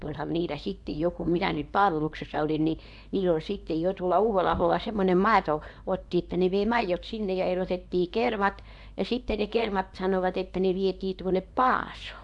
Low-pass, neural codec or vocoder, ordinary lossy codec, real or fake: 10.8 kHz; codec, 24 kHz, 0.9 kbps, WavTokenizer, small release; none; fake